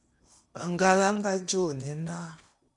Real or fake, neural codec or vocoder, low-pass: fake; codec, 16 kHz in and 24 kHz out, 0.8 kbps, FocalCodec, streaming, 65536 codes; 10.8 kHz